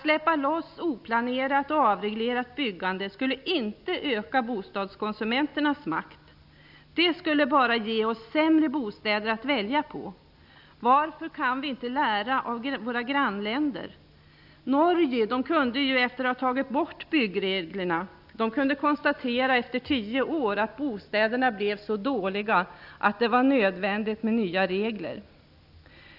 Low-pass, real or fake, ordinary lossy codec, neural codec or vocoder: 5.4 kHz; real; none; none